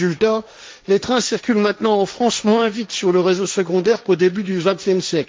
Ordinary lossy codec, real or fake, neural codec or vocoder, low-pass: none; fake; codec, 16 kHz, 1.1 kbps, Voila-Tokenizer; none